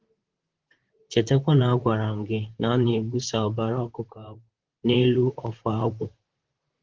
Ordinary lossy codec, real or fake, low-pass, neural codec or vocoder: Opus, 16 kbps; fake; 7.2 kHz; vocoder, 44.1 kHz, 128 mel bands, Pupu-Vocoder